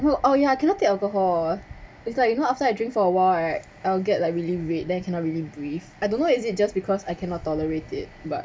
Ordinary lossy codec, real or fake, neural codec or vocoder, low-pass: none; real; none; none